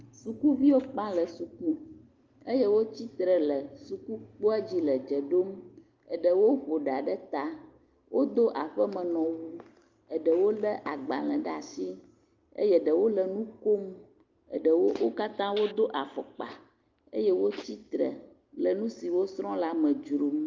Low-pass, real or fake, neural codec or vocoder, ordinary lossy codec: 7.2 kHz; real; none; Opus, 24 kbps